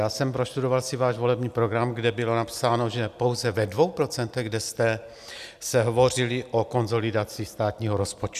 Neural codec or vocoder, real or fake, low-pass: none; real; 14.4 kHz